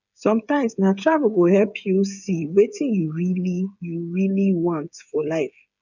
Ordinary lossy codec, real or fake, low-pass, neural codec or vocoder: none; fake; 7.2 kHz; codec, 16 kHz, 8 kbps, FreqCodec, smaller model